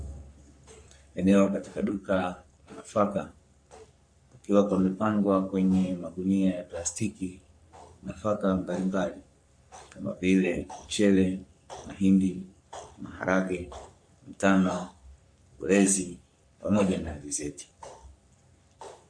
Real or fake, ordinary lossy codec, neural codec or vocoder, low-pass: fake; MP3, 48 kbps; codec, 44.1 kHz, 3.4 kbps, Pupu-Codec; 9.9 kHz